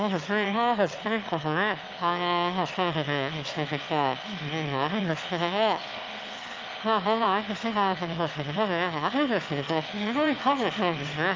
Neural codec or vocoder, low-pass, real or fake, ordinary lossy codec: autoencoder, 22.05 kHz, a latent of 192 numbers a frame, VITS, trained on one speaker; 7.2 kHz; fake; Opus, 24 kbps